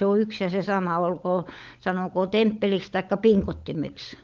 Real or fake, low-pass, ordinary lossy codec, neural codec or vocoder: fake; 7.2 kHz; Opus, 24 kbps; codec, 16 kHz, 16 kbps, FunCodec, trained on LibriTTS, 50 frames a second